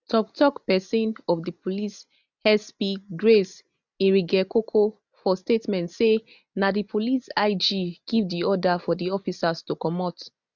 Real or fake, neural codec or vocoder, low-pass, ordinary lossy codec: real; none; 7.2 kHz; none